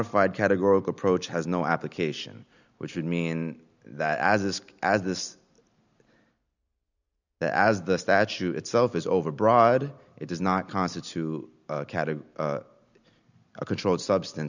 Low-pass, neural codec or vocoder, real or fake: 7.2 kHz; none; real